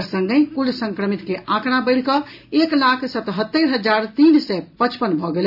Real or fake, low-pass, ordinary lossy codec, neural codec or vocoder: real; 5.4 kHz; none; none